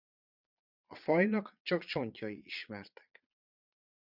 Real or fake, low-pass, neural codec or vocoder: fake; 5.4 kHz; vocoder, 22.05 kHz, 80 mel bands, Vocos